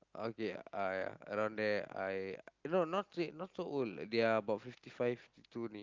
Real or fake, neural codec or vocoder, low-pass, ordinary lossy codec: real; none; 7.2 kHz; Opus, 32 kbps